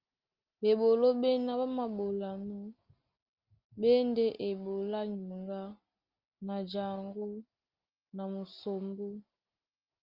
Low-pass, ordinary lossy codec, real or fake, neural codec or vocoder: 5.4 kHz; Opus, 32 kbps; real; none